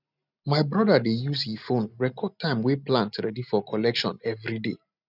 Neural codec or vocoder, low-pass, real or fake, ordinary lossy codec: none; 5.4 kHz; real; none